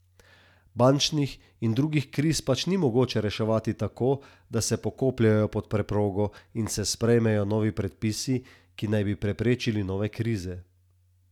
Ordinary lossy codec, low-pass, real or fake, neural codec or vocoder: none; 19.8 kHz; real; none